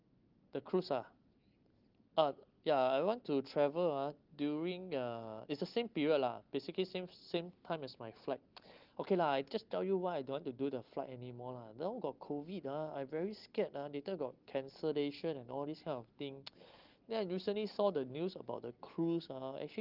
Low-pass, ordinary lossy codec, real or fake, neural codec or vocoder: 5.4 kHz; Opus, 32 kbps; real; none